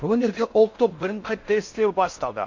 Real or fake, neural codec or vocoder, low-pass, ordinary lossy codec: fake; codec, 16 kHz in and 24 kHz out, 0.6 kbps, FocalCodec, streaming, 4096 codes; 7.2 kHz; MP3, 48 kbps